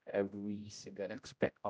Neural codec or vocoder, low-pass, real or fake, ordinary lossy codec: codec, 16 kHz, 0.5 kbps, X-Codec, HuBERT features, trained on general audio; none; fake; none